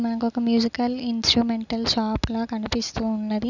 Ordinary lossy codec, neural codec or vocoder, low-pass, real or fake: none; none; 7.2 kHz; real